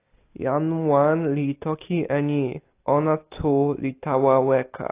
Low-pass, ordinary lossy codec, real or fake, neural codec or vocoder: 3.6 kHz; AAC, 24 kbps; real; none